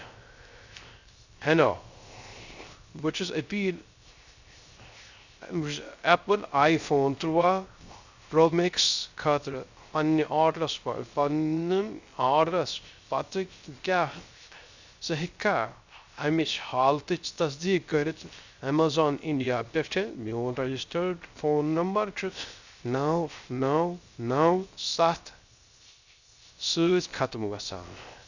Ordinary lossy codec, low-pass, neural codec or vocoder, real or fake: Opus, 64 kbps; 7.2 kHz; codec, 16 kHz, 0.3 kbps, FocalCodec; fake